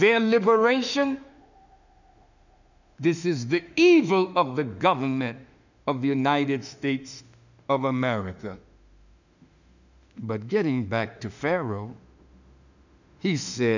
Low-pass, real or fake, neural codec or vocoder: 7.2 kHz; fake; autoencoder, 48 kHz, 32 numbers a frame, DAC-VAE, trained on Japanese speech